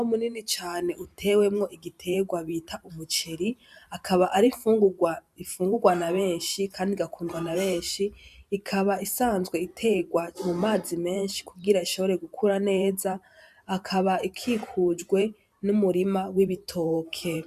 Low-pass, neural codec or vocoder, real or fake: 14.4 kHz; vocoder, 44.1 kHz, 128 mel bands every 512 samples, BigVGAN v2; fake